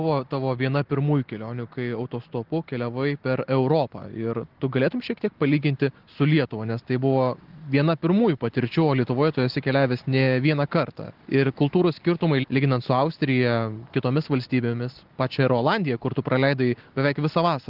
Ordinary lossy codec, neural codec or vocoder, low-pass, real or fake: Opus, 16 kbps; none; 5.4 kHz; real